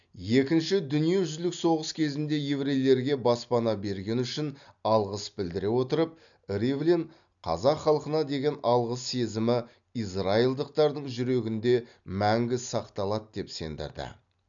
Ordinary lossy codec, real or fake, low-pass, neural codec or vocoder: none; real; 7.2 kHz; none